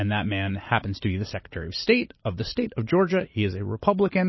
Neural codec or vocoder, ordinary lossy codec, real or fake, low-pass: none; MP3, 24 kbps; real; 7.2 kHz